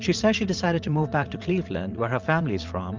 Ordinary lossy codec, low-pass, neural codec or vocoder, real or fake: Opus, 24 kbps; 7.2 kHz; vocoder, 44.1 kHz, 80 mel bands, Vocos; fake